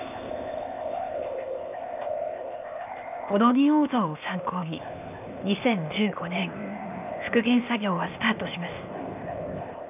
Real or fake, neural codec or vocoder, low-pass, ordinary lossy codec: fake; codec, 16 kHz, 0.8 kbps, ZipCodec; 3.6 kHz; none